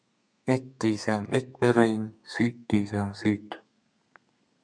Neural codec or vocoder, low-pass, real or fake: codec, 32 kHz, 1.9 kbps, SNAC; 9.9 kHz; fake